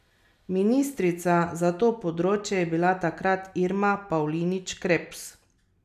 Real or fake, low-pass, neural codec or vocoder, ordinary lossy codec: real; 14.4 kHz; none; none